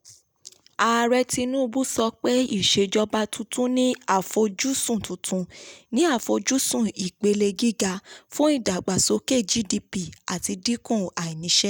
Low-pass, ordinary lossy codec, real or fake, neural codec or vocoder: none; none; real; none